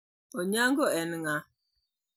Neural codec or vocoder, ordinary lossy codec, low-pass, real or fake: none; none; none; real